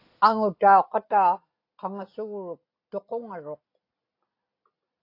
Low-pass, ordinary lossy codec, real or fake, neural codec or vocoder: 5.4 kHz; AAC, 32 kbps; real; none